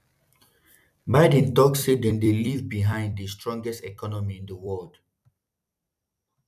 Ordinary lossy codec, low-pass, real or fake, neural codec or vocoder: none; 14.4 kHz; fake; vocoder, 44.1 kHz, 128 mel bands every 256 samples, BigVGAN v2